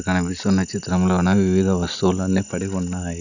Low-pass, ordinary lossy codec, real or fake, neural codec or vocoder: 7.2 kHz; none; real; none